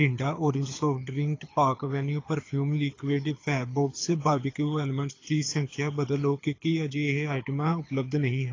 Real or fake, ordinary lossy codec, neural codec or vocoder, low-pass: fake; AAC, 32 kbps; codec, 24 kHz, 6 kbps, HILCodec; 7.2 kHz